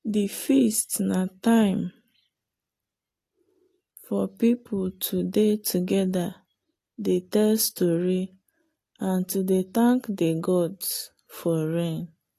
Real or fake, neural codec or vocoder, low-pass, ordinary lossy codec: real; none; 14.4 kHz; AAC, 48 kbps